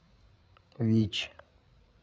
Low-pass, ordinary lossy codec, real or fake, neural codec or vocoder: none; none; fake; codec, 16 kHz, 8 kbps, FreqCodec, larger model